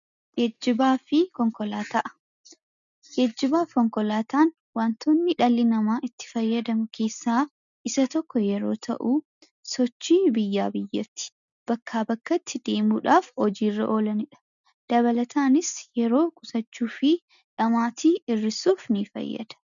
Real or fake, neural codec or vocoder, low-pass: real; none; 7.2 kHz